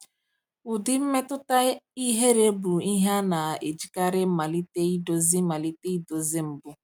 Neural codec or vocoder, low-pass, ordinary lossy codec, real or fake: none; none; none; real